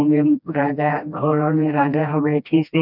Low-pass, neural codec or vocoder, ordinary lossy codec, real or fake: 5.4 kHz; codec, 16 kHz, 1 kbps, FreqCodec, smaller model; none; fake